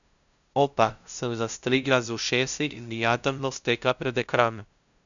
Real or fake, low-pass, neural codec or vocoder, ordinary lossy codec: fake; 7.2 kHz; codec, 16 kHz, 0.5 kbps, FunCodec, trained on LibriTTS, 25 frames a second; none